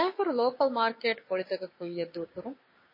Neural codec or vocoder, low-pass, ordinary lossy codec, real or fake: codec, 16 kHz, 6 kbps, DAC; 5.4 kHz; MP3, 24 kbps; fake